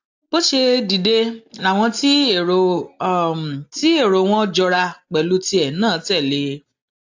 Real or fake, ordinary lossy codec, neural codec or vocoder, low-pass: real; AAC, 48 kbps; none; 7.2 kHz